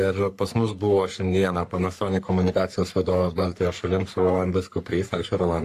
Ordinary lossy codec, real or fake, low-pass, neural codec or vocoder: AAC, 64 kbps; fake; 14.4 kHz; codec, 44.1 kHz, 3.4 kbps, Pupu-Codec